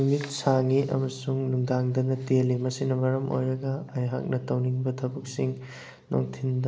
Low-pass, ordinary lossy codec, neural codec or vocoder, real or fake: none; none; none; real